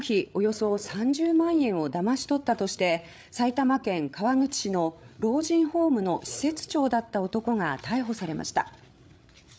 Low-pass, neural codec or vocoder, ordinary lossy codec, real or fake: none; codec, 16 kHz, 8 kbps, FreqCodec, larger model; none; fake